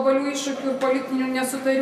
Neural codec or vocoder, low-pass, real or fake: none; 14.4 kHz; real